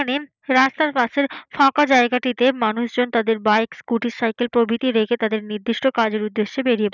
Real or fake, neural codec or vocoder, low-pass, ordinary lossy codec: real; none; 7.2 kHz; none